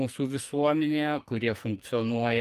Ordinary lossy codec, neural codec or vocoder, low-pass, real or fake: Opus, 32 kbps; codec, 44.1 kHz, 2.6 kbps, SNAC; 14.4 kHz; fake